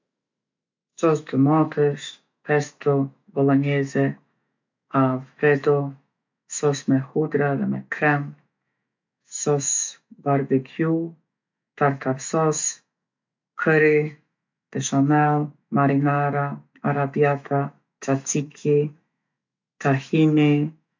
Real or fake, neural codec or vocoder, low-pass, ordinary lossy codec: fake; autoencoder, 48 kHz, 128 numbers a frame, DAC-VAE, trained on Japanese speech; 7.2 kHz; MP3, 64 kbps